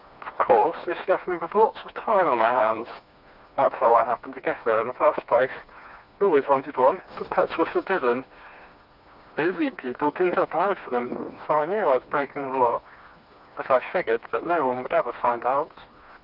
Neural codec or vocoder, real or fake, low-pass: codec, 16 kHz, 2 kbps, FreqCodec, smaller model; fake; 5.4 kHz